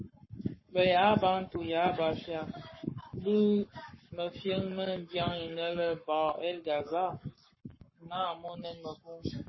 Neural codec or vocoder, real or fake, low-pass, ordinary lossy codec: codec, 44.1 kHz, 7.8 kbps, Pupu-Codec; fake; 7.2 kHz; MP3, 24 kbps